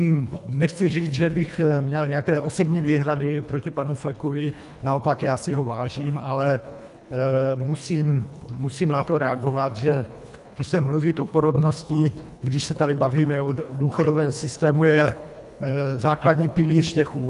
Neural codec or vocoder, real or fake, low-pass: codec, 24 kHz, 1.5 kbps, HILCodec; fake; 10.8 kHz